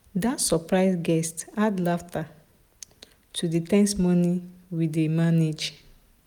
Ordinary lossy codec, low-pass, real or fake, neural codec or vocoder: none; 19.8 kHz; real; none